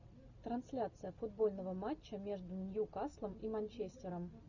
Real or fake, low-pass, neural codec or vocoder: real; 7.2 kHz; none